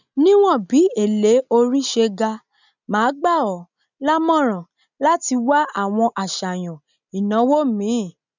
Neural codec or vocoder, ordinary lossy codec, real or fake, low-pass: none; none; real; 7.2 kHz